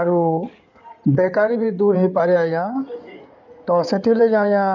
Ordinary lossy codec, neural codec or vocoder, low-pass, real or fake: none; codec, 16 kHz in and 24 kHz out, 2.2 kbps, FireRedTTS-2 codec; 7.2 kHz; fake